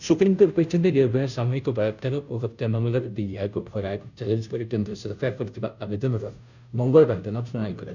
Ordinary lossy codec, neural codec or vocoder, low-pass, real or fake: none; codec, 16 kHz, 0.5 kbps, FunCodec, trained on Chinese and English, 25 frames a second; 7.2 kHz; fake